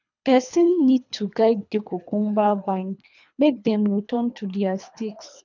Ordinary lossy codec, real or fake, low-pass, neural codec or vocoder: none; fake; 7.2 kHz; codec, 24 kHz, 3 kbps, HILCodec